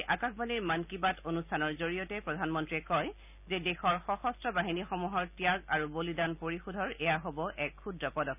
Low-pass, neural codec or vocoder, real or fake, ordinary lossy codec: 3.6 kHz; none; real; none